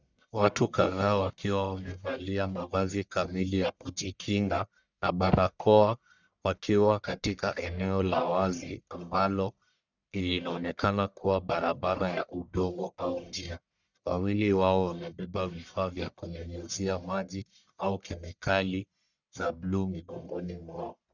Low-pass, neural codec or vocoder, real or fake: 7.2 kHz; codec, 44.1 kHz, 1.7 kbps, Pupu-Codec; fake